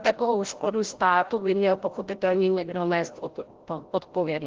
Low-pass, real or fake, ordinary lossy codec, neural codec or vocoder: 7.2 kHz; fake; Opus, 16 kbps; codec, 16 kHz, 0.5 kbps, FreqCodec, larger model